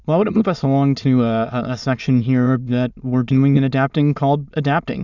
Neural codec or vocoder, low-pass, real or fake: autoencoder, 22.05 kHz, a latent of 192 numbers a frame, VITS, trained on many speakers; 7.2 kHz; fake